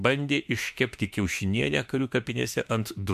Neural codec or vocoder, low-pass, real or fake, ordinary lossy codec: autoencoder, 48 kHz, 32 numbers a frame, DAC-VAE, trained on Japanese speech; 14.4 kHz; fake; MP3, 96 kbps